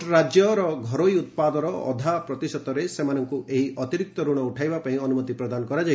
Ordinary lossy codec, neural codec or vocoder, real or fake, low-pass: none; none; real; none